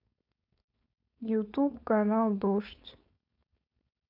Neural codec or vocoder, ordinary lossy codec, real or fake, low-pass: codec, 16 kHz, 4.8 kbps, FACodec; none; fake; 5.4 kHz